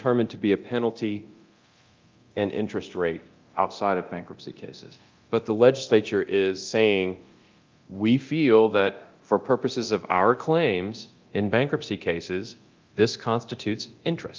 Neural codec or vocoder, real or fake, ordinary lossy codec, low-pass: codec, 24 kHz, 0.9 kbps, DualCodec; fake; Opus, 24 kbps; 7.2 kHz